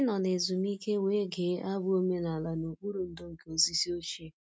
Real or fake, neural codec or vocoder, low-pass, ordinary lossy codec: real; none; none; none